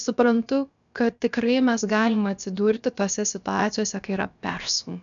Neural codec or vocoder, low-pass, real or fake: codec, 16 kHz, about 1 kbps, DyCAST, with the encoder's durations; 7.2 kHz; fake